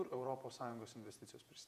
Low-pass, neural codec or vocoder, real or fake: 14.4 kHz; vocoder, 48 kHz, 128 mel bands, Vocos; fake